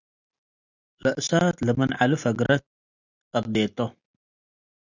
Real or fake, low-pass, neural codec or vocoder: real; 7.2 kHz; none